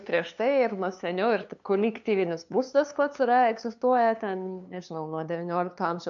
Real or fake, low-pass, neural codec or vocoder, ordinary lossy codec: fake; 7.2 kHz; codec, 16 kHz, 2 kbps, FunCodec, trained on LibriTTS, 25 frames a second; AAC, 64 kbps